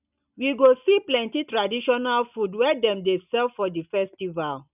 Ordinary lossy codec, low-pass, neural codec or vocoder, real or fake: none; 3.6 kHz; none; real